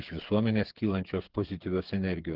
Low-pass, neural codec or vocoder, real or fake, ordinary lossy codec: 5.4 kHz; codec, 16 kHz, 8 kbps, FreqCodec, smaller model; fake; Opus, 16 kbps